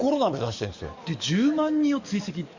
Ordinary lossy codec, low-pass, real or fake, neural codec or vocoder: Opus, 64 kbps; 7.2 kHz; fake; codec, 44.1 kHz, 7.8 kbps, DAC